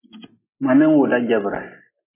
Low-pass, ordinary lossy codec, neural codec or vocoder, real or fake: 3.6 kHz; MP3, 16 kbps; none; real